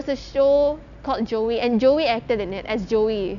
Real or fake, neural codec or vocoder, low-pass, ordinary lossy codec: real; none; 7.2 kHz; none